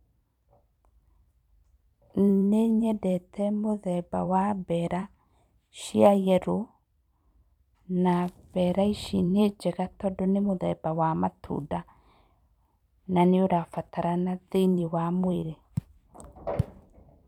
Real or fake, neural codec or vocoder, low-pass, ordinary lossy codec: fake; vocoder, 44.1 kHz, 128 mel bands every 512 samples, BigVGAN v2; 19.8 kHz; none